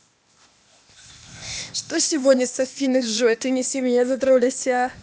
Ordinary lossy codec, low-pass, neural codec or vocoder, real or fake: none; none; codec, 16 kHz, 0.8 kbps, ZipCodec; fake